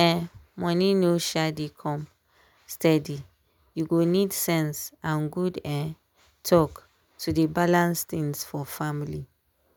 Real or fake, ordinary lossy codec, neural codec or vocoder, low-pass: real; none; none; none